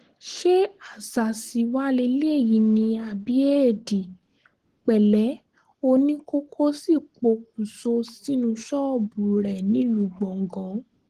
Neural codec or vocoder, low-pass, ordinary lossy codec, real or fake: codec, 44.1 kHz, 7.8 kbps, Pupu-Codec; 14.4 kHz; Opus, 16 kbps; fake